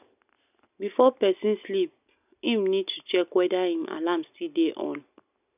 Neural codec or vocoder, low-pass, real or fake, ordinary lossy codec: none; 3.6 kHz; real; none